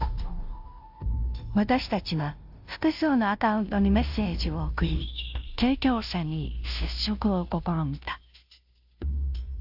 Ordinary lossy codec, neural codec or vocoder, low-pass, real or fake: AAC, 48 kbps; codec, 16 kHz, 0.5 kbps, FunCodec, trained on Chinese and English, 25 frames a second; 5.4 kHz; fake